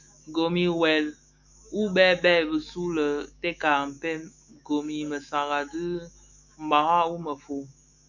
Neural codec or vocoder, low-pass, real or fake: autoencoder, 48 kHz, 128 numbers a frame, DAC-VAE, trained on Japanese speech; 7.2 kHz; fake